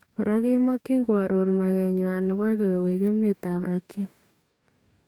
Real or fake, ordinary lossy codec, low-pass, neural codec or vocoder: fake; none; 19.8 kHz; codec, 44.1 kHz, 2.6 kbps, DAC